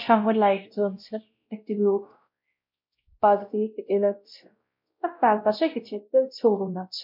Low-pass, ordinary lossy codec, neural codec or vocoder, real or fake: 5.4 kHz; MP3, 48 kbps; codec, 16 kHz, 0.5 kbps, X-Codec, WavLM features, trained on Multilingual LibriSpeech; fake